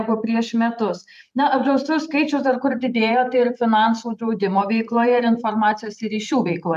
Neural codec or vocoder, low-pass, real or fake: vocoder, 44.1 kHz, 128 mel bands every 512 samples, BigVGAN v2; 14.4 kHz; fake